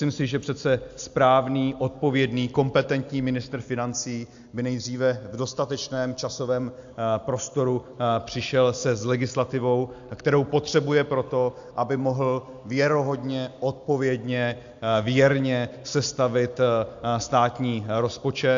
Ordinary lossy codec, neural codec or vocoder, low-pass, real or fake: AAC, 64 kbps; none; 7.2 kHz; real